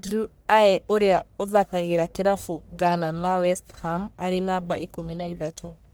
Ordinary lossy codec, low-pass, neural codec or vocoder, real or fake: none; none; codec, 44.1 kHz, 1.7 kbps, Pupu-Codec; fake